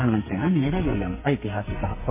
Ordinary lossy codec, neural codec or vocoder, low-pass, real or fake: MP3, 16 kbps; codec, 44.1 kHz, 2.6 kbps, SNAC; 3.6 kHz; fake